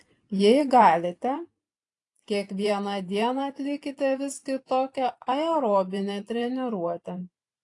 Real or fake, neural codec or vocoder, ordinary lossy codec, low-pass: fake; vocoder, 44.1 kHz, 128 mel bands every 512 samples, BigVGAN v2; AAC, 48 kbps; 10.8 kHz